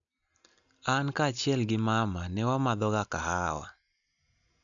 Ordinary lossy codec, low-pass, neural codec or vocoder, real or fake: none; 7.2 kHz; none; real